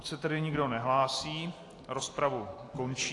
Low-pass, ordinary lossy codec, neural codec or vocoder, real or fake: 10.8 kHz; AAC, 48 kbps; none; real